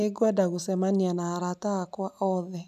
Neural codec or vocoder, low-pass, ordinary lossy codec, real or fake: none; 14.4 kHz; none; real